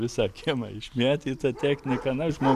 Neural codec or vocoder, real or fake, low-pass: none; real; 14.4 kHz